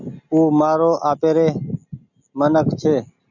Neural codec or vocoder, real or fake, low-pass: none; real; 7.2 kHz